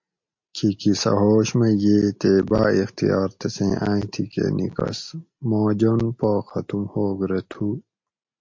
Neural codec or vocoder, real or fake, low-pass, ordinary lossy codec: none; real; 7.2 kHz; MP3, 48 kbps